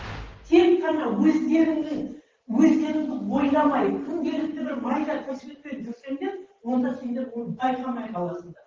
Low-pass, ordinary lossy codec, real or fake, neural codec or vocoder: 7.2 kHz; Opus, 16 kbps; real; none